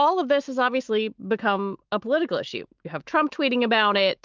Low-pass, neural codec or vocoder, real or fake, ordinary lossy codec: 7.2 kHz; autoencoder, 48 kHz, 128 numbers a frame, DAC-VAE, trained on Japanese speech; fake; Opus, 32 kbps